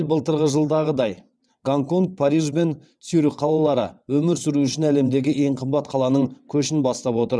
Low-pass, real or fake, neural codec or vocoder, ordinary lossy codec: none; fake; vocoder, 22.05 kHz, 80 mel bands, WaveNeXt; none